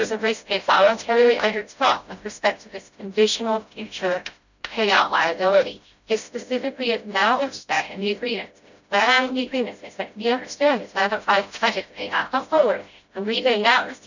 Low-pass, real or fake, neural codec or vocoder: 7.2 kHz; fake; codec, 16 kHz, 0.5 kbps, FreqCodec, smaller model